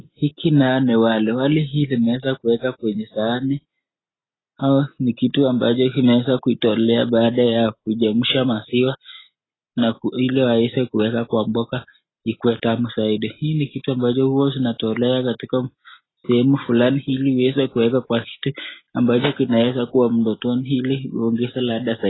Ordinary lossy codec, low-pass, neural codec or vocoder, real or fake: AAC, 16 kbps; 7.2 kHz; none; real